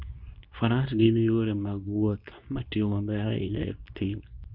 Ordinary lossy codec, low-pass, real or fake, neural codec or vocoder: none; 5.4 kHz; fake; codec, 24 kHz, 0.9 kbps, WavTokenizer, medium speech release version 2